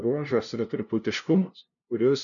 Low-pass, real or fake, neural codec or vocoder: 7.2 kHz; fake; codec, 16 kHz, 0.5 kbps, FunCodec, trained on LibriTTS, 25 frames a second